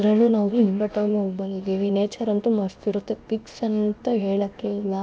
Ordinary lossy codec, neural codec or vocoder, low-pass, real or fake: none; codec, 16 kHz, 0.7 kbps, FocalCodec; none; fake